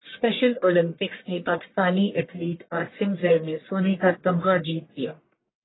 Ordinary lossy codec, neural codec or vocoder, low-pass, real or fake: AAC, 16 kbps; codec, 44.1 kHz, 1.7 kbps, Pupu-Codec; 7.2 kHz; fake